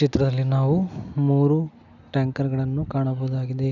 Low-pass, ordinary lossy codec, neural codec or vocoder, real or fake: 7.2 kHz; none; none; real